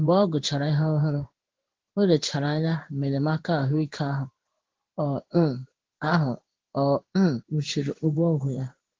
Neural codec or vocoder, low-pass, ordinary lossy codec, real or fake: codec, 16 kHz in and 24 kHz out, 1 kbps, XY-Tokenizer; 7.2 kHz; Opus, 16 kbps; fake